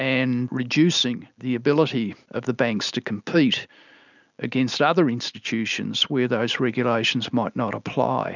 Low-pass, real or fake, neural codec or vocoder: 7.2 kHz; real; none